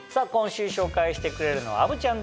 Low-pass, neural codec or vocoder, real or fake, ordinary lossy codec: none; none; real; none